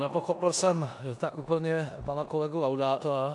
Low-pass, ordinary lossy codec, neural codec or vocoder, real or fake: 10.8 kHz; AAC, 64 kbps; codec, 16 kHz in and 24 kHz out, 0.9 kbps, LongCat-Audio-Codec, four codebook decoder; fake